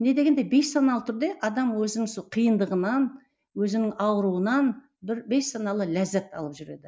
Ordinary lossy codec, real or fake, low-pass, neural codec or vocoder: none; real; none; none